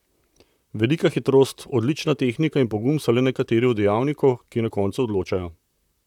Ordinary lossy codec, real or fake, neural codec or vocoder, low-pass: none; fake; vocoder, 44.1 kHz, 128 mel bands, Pupu-Vocoder; 19.8 kHz